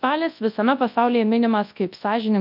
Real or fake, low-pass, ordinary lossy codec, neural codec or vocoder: fake; 5.4 kHz; AAC, 48 kbps; codec, 24 kHz, 0.9 kbps, WavTokenizer, large speech release